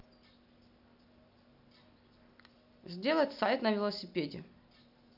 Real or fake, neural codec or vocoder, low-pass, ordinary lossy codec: real; none; 5.4 kHz; none